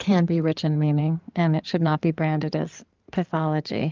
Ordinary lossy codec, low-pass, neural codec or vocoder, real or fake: Opus, 32 kbps; 7.2 kHz; codec, 16 kHz in and 24 kHz out, 2.2 kbps, FireRedTTS-2 codec; fake